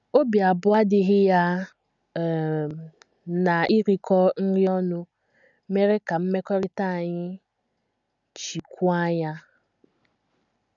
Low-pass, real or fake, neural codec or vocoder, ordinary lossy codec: 7.2 kHz; real; none; none